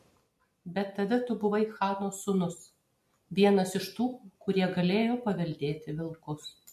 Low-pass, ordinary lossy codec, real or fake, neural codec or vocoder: 14.4 kHz; MP3, 64 kbps; real; none